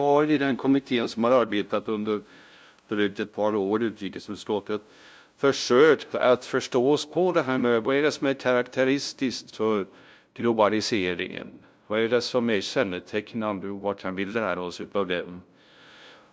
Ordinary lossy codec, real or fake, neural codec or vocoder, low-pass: none; fake; codec, 16 kHz, 0.5 kbps, FunCodec, trained on LibriTTS, 25 frames a second; none